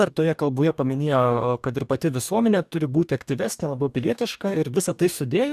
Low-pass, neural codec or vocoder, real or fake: 14.4 kHz; codec, 44.1 kHz, 2.6 kbps, DAC; fake